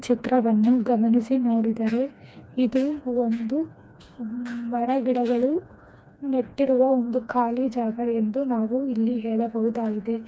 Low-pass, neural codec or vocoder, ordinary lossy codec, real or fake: none; codec, 16 kHz, 2 kbps, FreqCodec, smaller model; none; fake